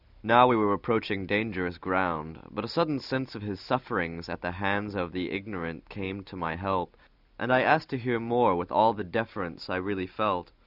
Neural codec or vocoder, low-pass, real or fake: none; 5.4 kHz; real